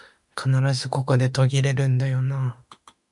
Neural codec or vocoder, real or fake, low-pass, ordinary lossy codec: autoencoder, 48 kHz, 32 numbers a frame, DAC-VAE, trained on Japanese speech; fake; 10.8 kHz; MP3, 96 kbps